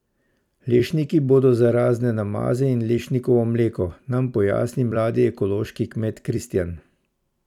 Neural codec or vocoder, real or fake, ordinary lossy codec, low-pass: vocoder, 44.1 kHz, 128 mel bands every 256 samples, BigVGAN v2; fake; none; 19.8 kHz